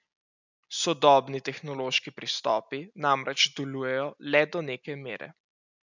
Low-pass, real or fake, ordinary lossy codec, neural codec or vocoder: 7.2 kHz; real; none; none